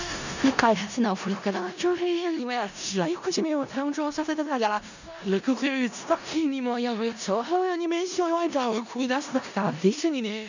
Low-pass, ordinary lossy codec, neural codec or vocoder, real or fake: 7.2 kHz; none; codec, 16 kHz in and 24 kHz out, 0.4 kbps, LongCat-Audio-Codec, four codebook decoder; fake